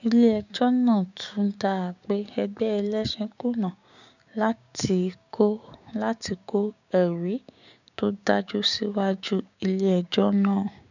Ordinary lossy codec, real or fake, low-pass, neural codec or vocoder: none; fake; 7.2 kHz; codec, 16 kHz, 6 kbps, DAC